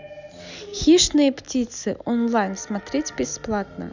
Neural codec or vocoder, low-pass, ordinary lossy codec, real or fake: none; 7.2 kHz; none; real